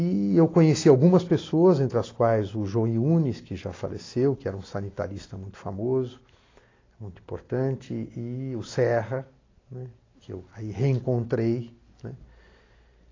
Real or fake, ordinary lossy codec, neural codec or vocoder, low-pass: real; AAC, 32 kbps; none; 7.2 kHz